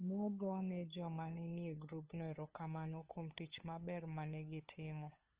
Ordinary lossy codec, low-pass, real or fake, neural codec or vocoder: Opus, 16 kbps; 3.6 kHz; fake; codec, 16 kHz, 8 kbps, FunCodec, trained on LibriTTS, 25 frames a second